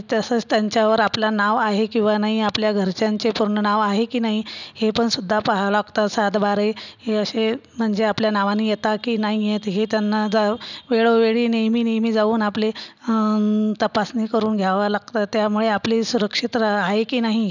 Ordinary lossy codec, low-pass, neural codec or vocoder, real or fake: none; 7.2 kHz; none; real